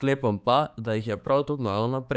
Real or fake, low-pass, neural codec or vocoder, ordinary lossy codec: fake; none; codec, 16 kHz, 4 kbps, X-Codec, HuBERT features, trained on balanced general audio; none